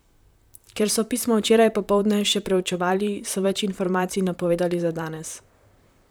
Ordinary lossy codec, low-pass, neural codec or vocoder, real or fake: none; none; vocoder, 44.1 kHz, 128 mel bands, Pupu-Vocoder; fake